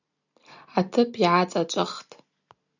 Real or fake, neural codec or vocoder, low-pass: real; none; 7.2 kHz